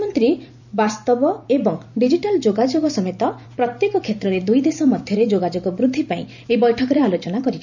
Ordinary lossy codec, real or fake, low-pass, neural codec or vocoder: none; fake; 7.2 kHz; vocoder, 44.1 kHz, 128 mel bands every 256 samples, BigVGAN v2